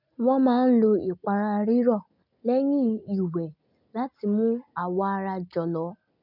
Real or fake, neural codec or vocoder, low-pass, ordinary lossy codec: real; none; 5.4 kHz; none